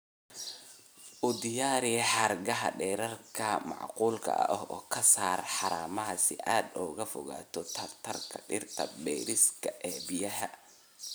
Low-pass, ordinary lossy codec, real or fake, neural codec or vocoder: none; none; real; none